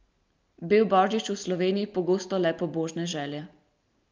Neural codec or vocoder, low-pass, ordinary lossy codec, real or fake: none; 7.2 kHz; Opus, 24 kbps; real